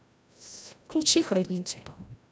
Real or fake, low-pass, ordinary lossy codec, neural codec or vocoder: fake; none; none; codec, 16 kHz, 0.5 kbps, FreqCodec, larger model